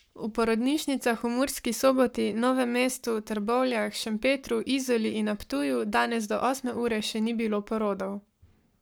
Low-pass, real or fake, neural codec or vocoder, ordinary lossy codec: none; fake; codec, 44.1 kHz, 7.8 kbps, Pupu-Codec; none